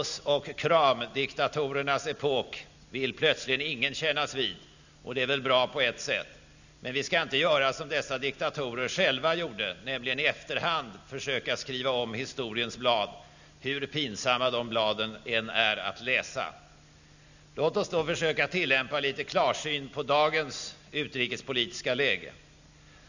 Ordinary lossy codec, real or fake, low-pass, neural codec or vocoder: none; real; 7.2 kHz; none